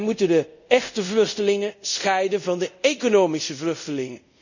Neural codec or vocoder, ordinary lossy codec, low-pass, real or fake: codec, 24 kHz, 0.5 kbps, DualCodec; none; 7.2 kHz; fake